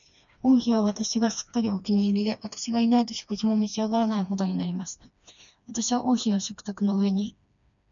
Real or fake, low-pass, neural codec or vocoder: fake; 7.2 kHz; codec, 16 kHz, 2 kbps, FreqCodec, smaller model